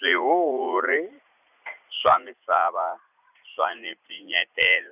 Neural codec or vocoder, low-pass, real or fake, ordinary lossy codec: vocoder, 44.1 kHz, 80 mel bands, Vocos; 3.6 kHz; fake; none